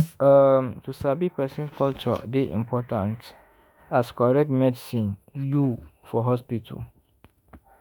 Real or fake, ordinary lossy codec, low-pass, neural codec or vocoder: fake; none; none; autoencoder, 48 kHz, 32 numbers a frame, DAC-VAE, trained on Japanese speech